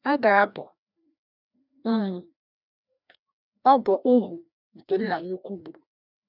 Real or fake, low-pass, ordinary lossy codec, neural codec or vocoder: fake; 5.4 kHz; none; codec, 16 kHz, 1 kbps, FreqCodec, larger model